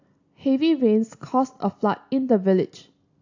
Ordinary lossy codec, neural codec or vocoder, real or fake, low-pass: AAC, 48 kbps; none; real; 7.2 kHz